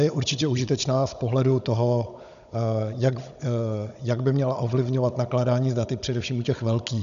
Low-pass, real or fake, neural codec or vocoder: 7.2 kHz; fake; codec, 16 kHz, 16 kbps, FunCodec, trained on Chinese and English, 50 frames a second